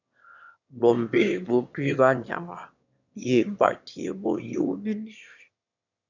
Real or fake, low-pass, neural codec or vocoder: fake; 7.2 kHz; autoencoder, 22.05 kHz, a latent of 192 numbers a frame, VITS, trained on one speaker